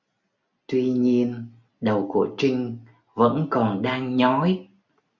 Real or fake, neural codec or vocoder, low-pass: real; none; 7.2 kHz